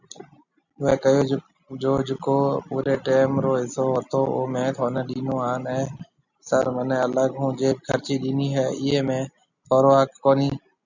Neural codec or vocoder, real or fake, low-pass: none; real; 7.2 kHz